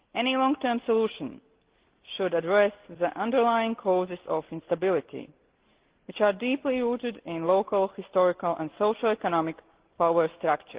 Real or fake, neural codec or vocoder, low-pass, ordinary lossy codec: real; none; 3.6 kHz; Opus, 16 kbps